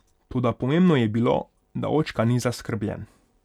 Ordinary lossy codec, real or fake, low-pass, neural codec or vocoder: none; real; 19.8 kHz; none